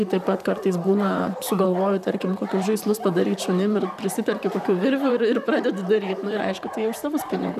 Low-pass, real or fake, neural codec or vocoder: 14.4 kHz; fake; vocoder, 44.1 kHz, 128 mel bands, Pupu-Vocoder